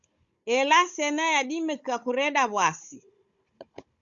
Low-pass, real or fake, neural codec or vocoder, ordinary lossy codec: 7.2 kHz; fake; codec, 16 kHz, 16 kbps, FunCodec, trained on Chinese and English, 50 frames a second; Opus, 64 kbps